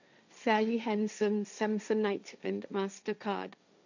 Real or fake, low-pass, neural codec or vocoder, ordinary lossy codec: fake; 7.2 kHz; codec, 16 kHz, 1.1 kbps, Voila-Tokenizer; none